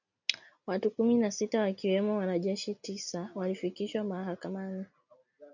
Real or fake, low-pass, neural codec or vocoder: real; 7.2 kHz; none